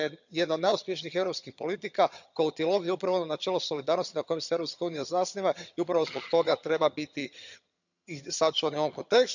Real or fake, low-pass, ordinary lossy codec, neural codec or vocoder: fake; 7.2 kHz; none; vocoder, 22.05 kHz, 80 mel bands, HiFi-GAN